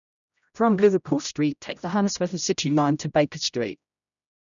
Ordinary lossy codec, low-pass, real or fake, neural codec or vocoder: none; 7.2 kHz; fake; codec, 16 kHz, 0.5 kbps, X-Codec, HuBERT features, trained on general audio